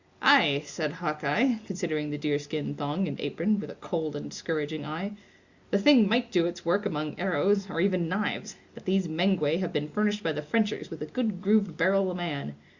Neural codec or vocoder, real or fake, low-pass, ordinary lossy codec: none; real; 7.2 kHz; Opus, 64 kbps